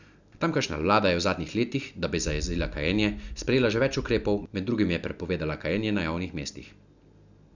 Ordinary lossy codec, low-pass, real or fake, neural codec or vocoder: none; 7.2 kHz; real; none